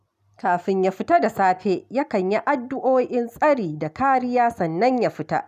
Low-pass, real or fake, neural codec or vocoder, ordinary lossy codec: 14.4 kHz; real; none; none